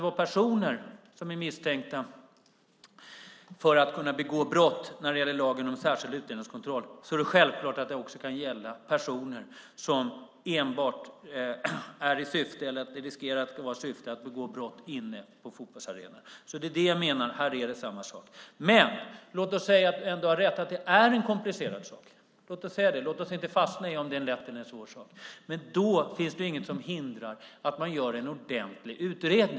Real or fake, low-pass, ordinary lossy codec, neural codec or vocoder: real; none; none; none